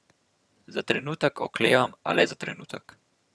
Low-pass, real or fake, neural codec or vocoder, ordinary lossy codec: none; fake; vocoder, 22.05 kHz, 80 mel bands, HiFi-GAN; none